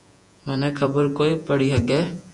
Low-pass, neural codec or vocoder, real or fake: 10.8 kHz; vocoder, 48 kHz, 128 mel bands, Vocos; fake